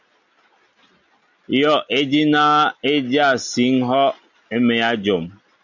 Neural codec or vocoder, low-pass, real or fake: none; 7.2 kHz; real